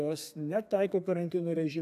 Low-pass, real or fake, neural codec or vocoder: 14.4 kHz; fake; codec, 32 kHz, 1.9 kbps, SNAC